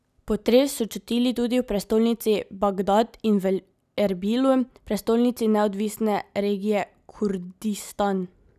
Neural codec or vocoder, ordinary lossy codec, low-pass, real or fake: none; none; 14.4 kHz; real